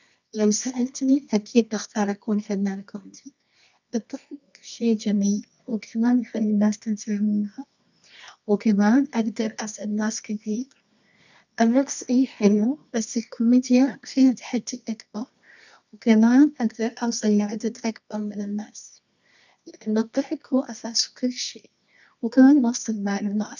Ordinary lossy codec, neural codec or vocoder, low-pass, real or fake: none; codec, 24 kHz, 0.9 kbps, WavTokenizer, medium music audio release; 7.2 kHz; fake